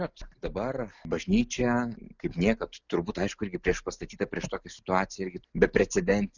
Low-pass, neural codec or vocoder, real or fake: 7.2 kHz; none; real